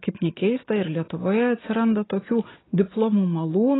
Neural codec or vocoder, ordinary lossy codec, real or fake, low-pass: none; AAC, 16 kbps; real; 7.2 kHz